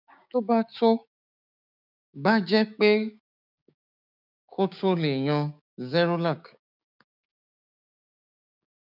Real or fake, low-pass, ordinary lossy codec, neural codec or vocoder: fake; 5.4 kHz; none; codec, 16 kHz, 6 kbps, DAC